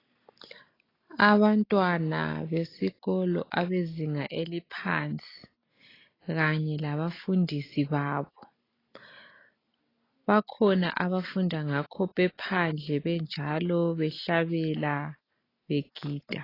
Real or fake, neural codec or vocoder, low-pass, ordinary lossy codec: real; none; 5.4 kHz; AAC, 24 kbps